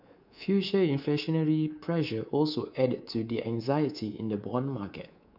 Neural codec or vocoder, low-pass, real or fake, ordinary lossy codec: codec, 24 kHz, 3.1 kbps, DualCodec; 5.4 kHz; fake; none